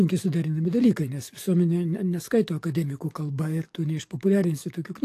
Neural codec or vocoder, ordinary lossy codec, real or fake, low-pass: codec, 44.1 kHz, 7.8 kbps, DAC; AAC, 64 kbps; fake; 14.4 kHz